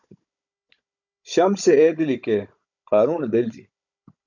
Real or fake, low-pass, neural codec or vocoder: fake; 7.2 kHz; codec, 16 kHz, 16 kbps, FunCodec, trained on Chinese and English, 50 frames a second